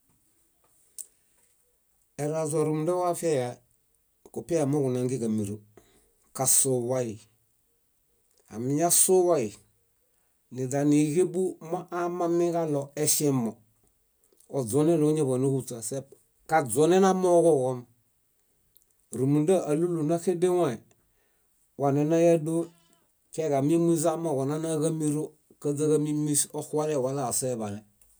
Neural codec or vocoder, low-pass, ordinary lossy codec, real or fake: none; none; none; real